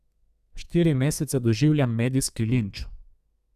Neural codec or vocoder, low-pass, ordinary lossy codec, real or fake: codec, 32 kHz, 1.9 kbps, SNAC; 14.4 kHz; none; fake